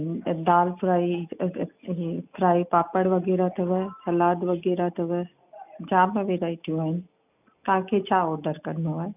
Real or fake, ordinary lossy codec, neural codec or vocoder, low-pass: real; none; none; 3.6 kHz